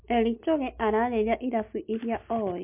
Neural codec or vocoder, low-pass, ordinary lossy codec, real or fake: none; 3.6 kHz; MP3, 32 kbps; real